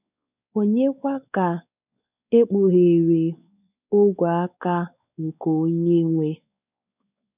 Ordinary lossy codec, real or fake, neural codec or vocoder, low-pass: none; fake; codec, 16 kHz, 4 kbps, X-Codec, WavLM features, trained on Multilingual LibriSpeech; 3.6 kHz